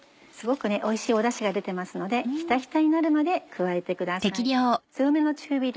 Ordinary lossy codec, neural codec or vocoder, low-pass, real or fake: none; none; none; real